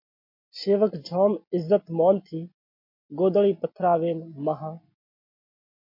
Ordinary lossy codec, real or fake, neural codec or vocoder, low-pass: MP3, 32 kbps; real; none; 5.4 kHz